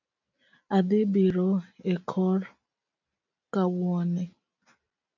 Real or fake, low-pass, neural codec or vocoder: real; 7.2 kHz; none